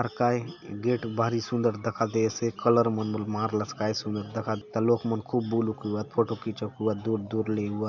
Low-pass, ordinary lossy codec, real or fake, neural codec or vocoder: 7.2 kHz; none; real; none